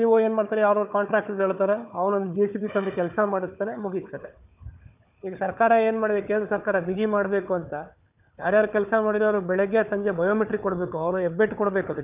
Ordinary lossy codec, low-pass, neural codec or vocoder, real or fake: none; 3.6 kHz; codec, 16 kHz, 4 kbps, FunCodec, trained on Chinese and English, 50 frames a second; fake